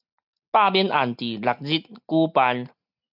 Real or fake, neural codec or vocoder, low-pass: real; none; 5.4 kHz